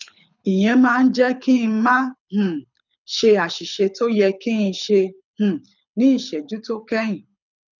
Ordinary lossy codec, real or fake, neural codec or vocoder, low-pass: none; fake; codec, 24 kHz, 6 kbps, HILCodec; 7.2 kHz